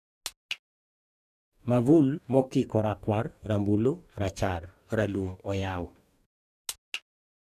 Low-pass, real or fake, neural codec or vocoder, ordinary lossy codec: 14.4 kHz; fake; codec, 44.1 kHz, 2.6 kbps, DAC; none